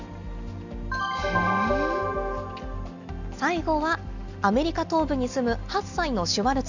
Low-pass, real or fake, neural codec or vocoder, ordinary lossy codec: 7.2 kHz; real; none; none